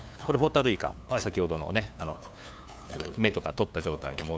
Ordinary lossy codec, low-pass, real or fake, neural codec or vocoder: none; none; fake; codec, 16 kHz, 2 kbps, FunCodec, trained on LibriTTS, 25 frames a second